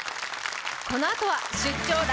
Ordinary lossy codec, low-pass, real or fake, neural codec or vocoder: none; none; real; none